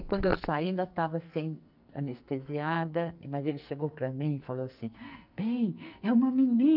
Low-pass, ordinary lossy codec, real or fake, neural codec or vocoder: 5.4 kHz; none; fake; codec, 44.1 kHz, 2.6 kbps, SNAC